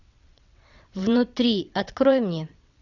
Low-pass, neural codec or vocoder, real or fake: 7.2 kHz; vocoder, 22.05 kHz, 80 mel bands, WaveNeXt; fake